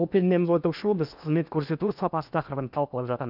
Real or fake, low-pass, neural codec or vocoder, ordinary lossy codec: fake; 5.4 kHz; codec, 16 kHz in and 24 kHz out, 0.8 kbps, FocalCodec, streaming, 65536 codes; none